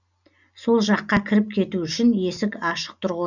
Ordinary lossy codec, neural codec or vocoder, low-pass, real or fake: none; none; 7.2 kHz; real